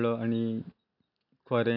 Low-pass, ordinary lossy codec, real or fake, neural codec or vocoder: 5.4 kHz; AAC, 48 kbps; real; none